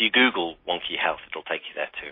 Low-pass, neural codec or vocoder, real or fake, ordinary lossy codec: 5.4 kHz; none; real; MP3, 24 kbps